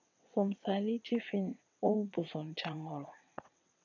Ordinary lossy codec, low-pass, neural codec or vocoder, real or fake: MP3, 48 kbps; 7.2 kHz; vocoder, 22.05 kHz, 80 mel bands, WaveNeXt; fake